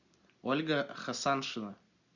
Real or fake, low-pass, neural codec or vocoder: real; 7.2 kHz; none